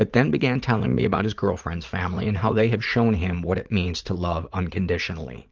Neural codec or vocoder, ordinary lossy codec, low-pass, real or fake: vocoder, 44.1 kHz, 80 mel bands, Vocos; Opus, 32 kbps; 7.2 kHz; fake